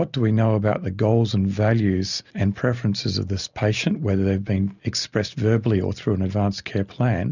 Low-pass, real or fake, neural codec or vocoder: 7.2 kHz; real; none